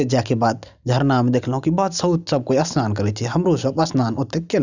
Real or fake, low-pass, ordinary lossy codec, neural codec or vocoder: real; 7.2 kHz; none; none